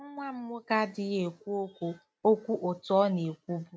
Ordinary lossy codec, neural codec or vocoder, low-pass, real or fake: none; none; none; real